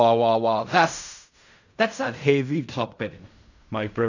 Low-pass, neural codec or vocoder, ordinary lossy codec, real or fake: 7.2 kHz; codec, 16 kHz in and 24 kHz out, 0.4 kbps, LongCat-Audio-Codec, fine tuned four codebook decoder; none; fake